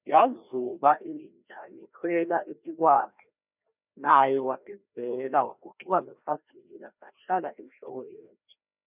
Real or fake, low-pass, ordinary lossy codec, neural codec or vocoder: fake; 3.6 kHz; none; codec, 16 kHz, 1 kbps, FreqCodec, larger model